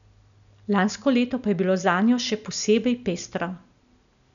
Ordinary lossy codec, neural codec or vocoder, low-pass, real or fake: none; none; 7.2 kHz; real